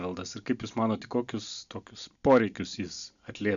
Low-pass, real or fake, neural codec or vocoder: 7.2 kHz; real; none